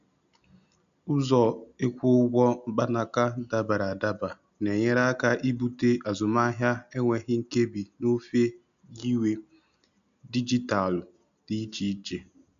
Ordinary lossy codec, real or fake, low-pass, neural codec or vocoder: none; real; 7.2 kHz; none